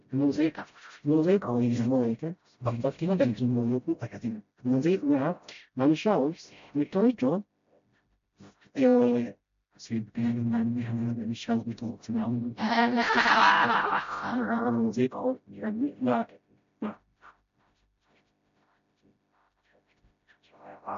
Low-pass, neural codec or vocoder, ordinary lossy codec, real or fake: 7.2 kHz; codec, 16 kHz, 0.5 kbps, FreqCodec, smaller model; MP3, 48 kbps; fake